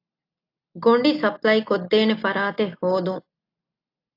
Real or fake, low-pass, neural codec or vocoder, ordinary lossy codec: real; 5.4 kHz; none; AAC, 32 kbps